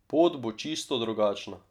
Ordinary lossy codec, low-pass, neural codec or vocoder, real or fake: none; 19.8 kHz; none; real